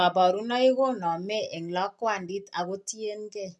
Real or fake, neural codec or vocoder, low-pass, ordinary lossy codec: real; none; none; none